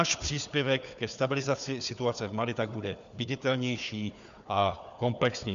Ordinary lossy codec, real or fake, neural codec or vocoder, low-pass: MP3, 96 kbps; fake; codec, 16 kHz, 4 kbps, FunCodec, trained on Chinese and English, 50 frames a second; 7.2 kHz